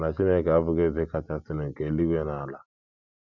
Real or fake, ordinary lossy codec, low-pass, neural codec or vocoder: real; none; 7.2 kHz; none